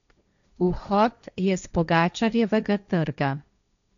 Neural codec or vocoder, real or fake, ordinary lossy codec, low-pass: codec, 16 kHz, 1.1 kbps, Voila-Tokenizer; fake; none; 7.2 kHz